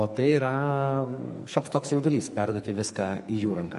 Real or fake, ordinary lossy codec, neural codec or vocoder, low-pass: fake; MP3, 48 kbps; codec, 44.1 kHz, 2.6 kbps, SNAC; 14.4 kHz